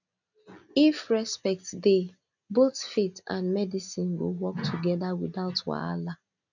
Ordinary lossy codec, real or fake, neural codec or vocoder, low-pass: none; real; none; 7.2 kHz